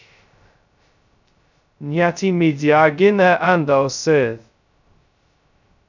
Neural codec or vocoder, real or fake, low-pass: codec, 16 kHz, 0.2 kbps, FocalCodec; fake; 7.2 kHz